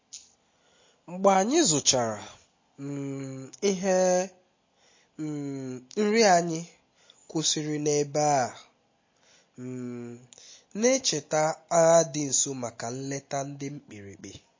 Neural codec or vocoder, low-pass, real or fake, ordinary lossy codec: none; 7.2 kHz; real; MP3, 32 kbps